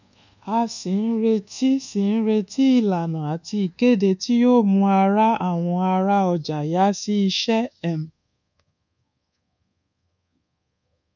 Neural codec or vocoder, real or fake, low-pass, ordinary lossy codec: codec, 24 kHz, 1.2 kbps, DualCodec; fake; 7.2 kHz; none